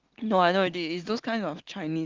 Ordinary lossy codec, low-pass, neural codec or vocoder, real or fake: Opus, 16 kbps; 7.2 kHz; none; real